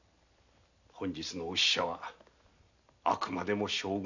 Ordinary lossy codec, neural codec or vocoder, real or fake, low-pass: none; none; real; 7.2 kHz